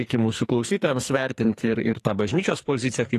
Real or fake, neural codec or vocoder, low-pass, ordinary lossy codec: fake; codec, 44.1 kHz, 2.6 kbps, SNAC; 14.4 kHz; AAC, 48 kbps